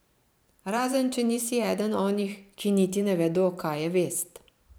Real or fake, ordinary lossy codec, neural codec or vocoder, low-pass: fake; none; vocoder, 44.1 kHz, 128 mel bands every 512 samples, BigVGAN v2; none